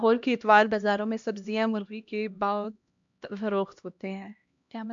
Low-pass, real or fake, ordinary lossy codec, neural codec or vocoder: 7.2 kHz; fake; MP3, 64 kbps; codec, 16 kHz, 1 kbps, X-Codec, HuBERT features, trained on LibriSpeech